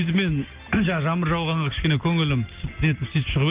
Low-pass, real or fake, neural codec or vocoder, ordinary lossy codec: 3.6 kHz; real; none; Opus, 32 kbps